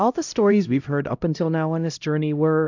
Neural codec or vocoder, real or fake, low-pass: codec, 16 kHz, 0.5 kbps, X-Codec, HuBERT features, trained on LibriSpeech; fake; 7.2 kHz